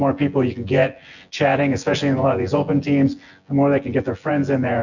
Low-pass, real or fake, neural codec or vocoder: 7.2 kHz; fake; vocoder, 24 kHz, 100 mel bands, Vocos